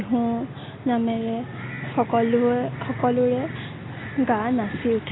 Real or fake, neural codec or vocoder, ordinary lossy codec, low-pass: real; none; AAC, 16 kbps; 7.2 kHz